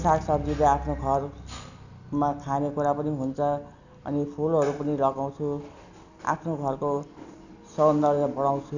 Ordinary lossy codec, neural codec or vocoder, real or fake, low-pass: none; none; real; 7.2 kHz